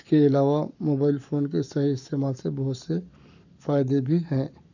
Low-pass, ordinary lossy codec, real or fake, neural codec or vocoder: 7.2 kHz; none; fake; codec, 44.1 kHz, 7.8 kbps, Pupu-Codec